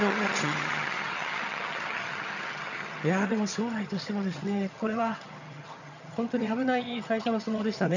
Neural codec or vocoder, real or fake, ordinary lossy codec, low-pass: vocoder, 22.05 kHz, 80 mel bands, HiFi-GAN; fake; none; 7.2 kHz